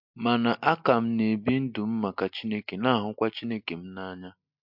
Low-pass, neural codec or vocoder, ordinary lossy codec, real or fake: 5.4 kHz; none; MP3, 48 kbps; real